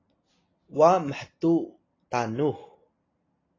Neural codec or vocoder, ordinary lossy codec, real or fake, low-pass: none; AAC, 32 kbps; real; 7.2 kHz